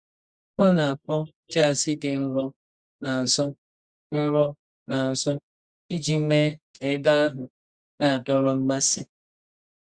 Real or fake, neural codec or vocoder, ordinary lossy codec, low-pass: fake; codec, 24 kHz, 0.9 kbps, WavTokenizer, medium music audio release; Opus, 64 kbps; 9.9 kHz